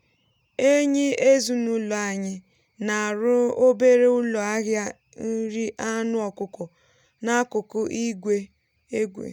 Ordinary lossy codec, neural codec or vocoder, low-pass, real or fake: none; none; 19.8 kHz; real